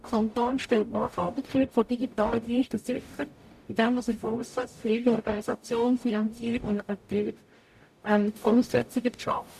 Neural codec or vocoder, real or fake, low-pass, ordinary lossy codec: codec, 44.1 kHz, 0.9 kbps, DAC; fake; 14.4 kHz; none